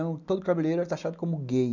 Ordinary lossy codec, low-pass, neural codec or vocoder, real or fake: none; 7.2 kHz; none; real